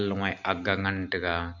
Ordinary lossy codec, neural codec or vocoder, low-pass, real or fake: none; none; 7.2 kHz; real